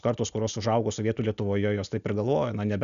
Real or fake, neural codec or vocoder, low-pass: real; none; 7.2 kHz